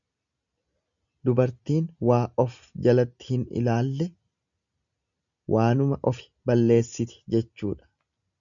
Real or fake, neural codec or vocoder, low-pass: real; none; 7.2 kHz